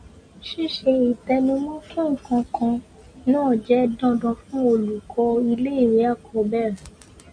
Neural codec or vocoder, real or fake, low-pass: none; real; 9.9 kHz